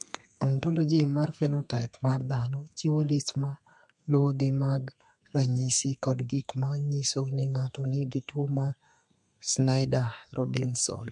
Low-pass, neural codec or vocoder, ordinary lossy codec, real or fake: 10.8 kHz; codec, 32 kHz, 1.9 kbps, SNAC; MP3, 64 kbps; fake